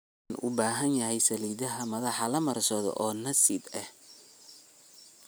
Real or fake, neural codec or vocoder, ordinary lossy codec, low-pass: real; none; none; none